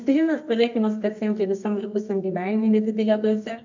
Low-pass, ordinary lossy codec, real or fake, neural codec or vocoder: 7.2 kHz; MP3, 64 kbps; fake; codec, 24 kHz, 0.9 kbps, WavTokenizer, medium music audio release